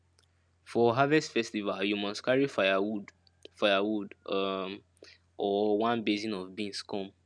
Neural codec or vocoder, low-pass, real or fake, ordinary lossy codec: none; 9.9 kHz; real; none